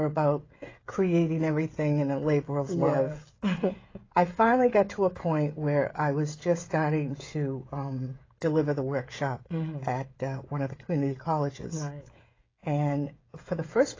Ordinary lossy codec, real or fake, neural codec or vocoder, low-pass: AAC, 32 kbps; fake; codec, 16 kHz, 16 kbps, FreqCodec, smaller model; 7.2 kHz